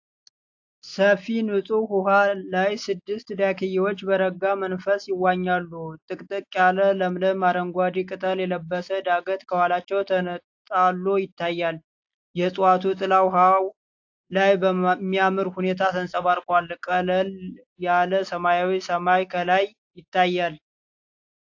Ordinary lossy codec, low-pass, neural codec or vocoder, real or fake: AAC, 48 kbps; 7.2 kHz; none; real